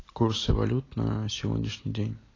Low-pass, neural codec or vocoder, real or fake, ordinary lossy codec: 7.2 kHz; none; real; AAC, 32 kbps